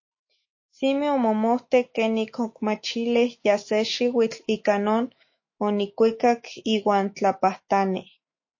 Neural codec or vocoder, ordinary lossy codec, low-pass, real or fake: autoencoder, 48 kHz, 128 numbers a frame, DAC-VAE, trained on Japanese speech; MP3, 32 kbps; 7.2 kHz; fake